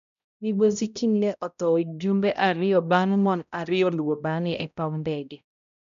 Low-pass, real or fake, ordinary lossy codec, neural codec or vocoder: 7.2 kHz; fake; none; codec, 16 kHz, 0.5 kbps, X-Codec, HuBERT features, trained on balanced general audio